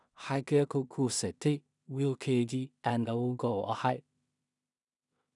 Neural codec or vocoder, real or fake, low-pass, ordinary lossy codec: codec, 16 kHz in and 24 kHz out, 0.4 kbps, LongCat-Audio-Codec, two codebook decoder; fake; 10.8 kHz; none